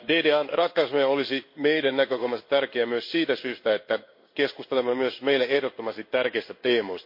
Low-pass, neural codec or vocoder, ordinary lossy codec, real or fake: 5.4 kHz; codec, 16 kHz in and 24 kHz out, 1 kbps, XY-Tokenizer; MP3, 32 kbps; fake